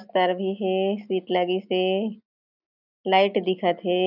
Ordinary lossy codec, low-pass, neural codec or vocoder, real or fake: none; 5.4 kHz; none; real